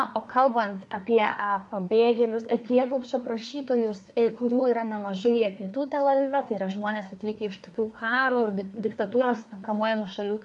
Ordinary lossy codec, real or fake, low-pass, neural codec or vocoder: MP3, 64 kbps; fake; 10.8 kHz; codec, 24 kHz, 1 kbps, SNAC